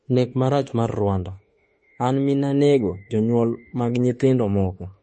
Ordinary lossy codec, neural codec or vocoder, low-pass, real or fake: MP3, 32 kbps; autoencoder, 48 kHz, 32 numbers a frame, DAC-VAE, trained on Japanese speech; 10.8 kHz; fake